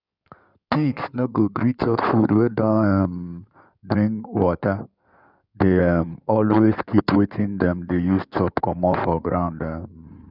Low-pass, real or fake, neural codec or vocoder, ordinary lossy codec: 5.4 kHz; fake; codec, 16 kHz in and 24 kHz out, 2.2 kbps, FireRedTTS-2 codec; none